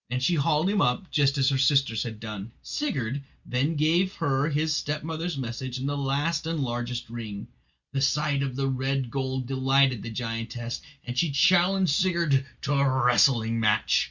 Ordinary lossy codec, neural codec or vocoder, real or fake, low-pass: Opus, 64 kbps; none; real; 7.2 kHz